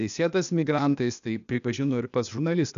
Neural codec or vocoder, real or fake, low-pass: codec, 16 kHz, 0.8 kbps, ZipCodec; fake; 7.2 kHz